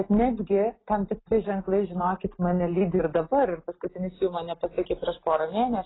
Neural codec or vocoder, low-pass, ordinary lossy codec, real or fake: none; 7.2 kHz; AAC, 16 kbps; real